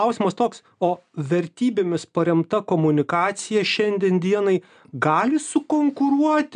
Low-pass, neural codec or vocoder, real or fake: 9.9 kHz; none; real